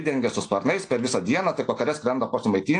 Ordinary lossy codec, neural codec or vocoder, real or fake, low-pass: AAC, 48 kbps; none; real; 9.9 kHz